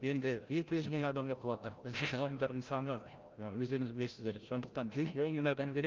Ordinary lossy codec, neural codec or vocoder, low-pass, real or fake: Opus, 24 kbps; codec, 16 kHz, 0.5 kbps, FreqCodec, larger model; 7.2 kHz; fake